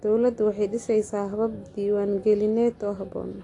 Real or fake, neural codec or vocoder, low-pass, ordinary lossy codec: real; none; 10.8 kHz; AAC, 48 kbps